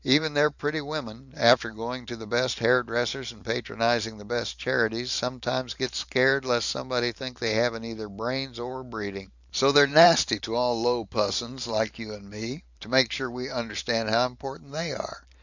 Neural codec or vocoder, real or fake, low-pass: vocoder, 44.1 kHz, 128 mel bands every 512 samples, BigVGAN v2; fake; 7.2 kHz